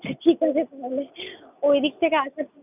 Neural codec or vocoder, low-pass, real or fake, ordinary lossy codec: none; 3.6 kHz; real; none